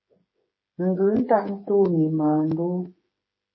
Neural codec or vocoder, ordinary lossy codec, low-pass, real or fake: codec, 16 kHz, 8 kbps, FreqCodec, smaller model; MP3, 24 kbps; 7.2 kHz; fake